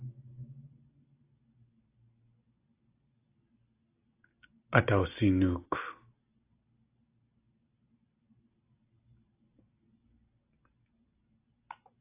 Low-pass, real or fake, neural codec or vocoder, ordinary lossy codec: 3.6 kHz; real; none; AAC, 24 kbps